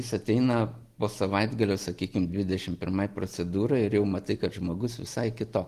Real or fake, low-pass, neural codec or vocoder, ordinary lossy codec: real; 14.4 kHz; none; Opus, 24 kbps